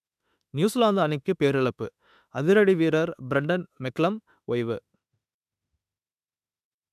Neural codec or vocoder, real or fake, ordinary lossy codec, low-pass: autoencoder, 48 kHz, 32 numbers a frame, DAC-VAE, trained on Japanese speech; fake; none; 14.4 kHz